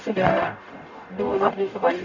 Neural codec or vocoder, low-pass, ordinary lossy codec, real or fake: codec, 44.1 kHz, 0.9 kbps, DAC; 7.2 kHz; none; fake